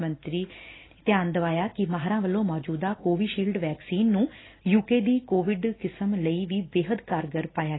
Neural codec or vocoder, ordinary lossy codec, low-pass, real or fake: none; AAC, 16 kbps; 7.2 kHz; real